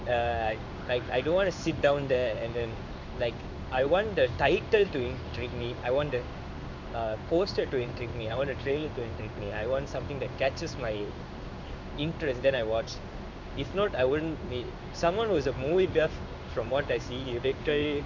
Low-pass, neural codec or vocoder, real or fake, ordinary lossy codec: 7.2 kHz; codec, 16 kHz in and 24 kHz out, 1 kbps, XY-Tokenizer; fake; MP3, 64 kbps